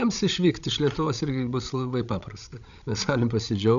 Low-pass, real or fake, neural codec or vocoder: 7.2 kHz; fake; codec, 16 kHz, 16 kbps, FreqCodec, larger model